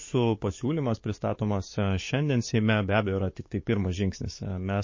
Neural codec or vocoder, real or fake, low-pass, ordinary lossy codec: codec, 44.1 kHz, 7.8 kbps, DAC; fake; 7.2 kHz; MP3, 32 kbps